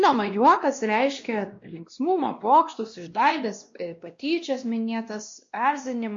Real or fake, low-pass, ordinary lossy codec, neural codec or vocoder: fake; 7.2 kHz; MP3, 48 kbps; codec, 16 kHz, 1 kbps, X-Codec, WavLM features, trained on Multilingual LibriSpeech